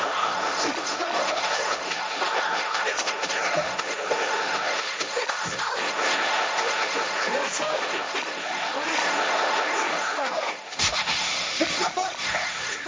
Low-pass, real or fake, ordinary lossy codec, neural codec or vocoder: none; fake; none; codec, 16 kHz, 1.1 kbps, Voila-Tokenizer